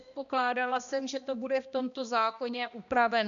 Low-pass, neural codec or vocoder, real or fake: 7.2 kHz; codec, 16 kHz, 1 kbps, X-Codec, HuBERT features, trained on balanced general audio; fake